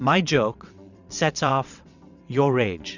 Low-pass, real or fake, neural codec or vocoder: 7.2 kHz; fake; vocoder, 22.05 kHz, 80 mel bands, WaveNeXt